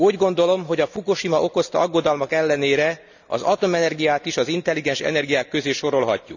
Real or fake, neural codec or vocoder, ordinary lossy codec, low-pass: real; none; none; 7.2 kHz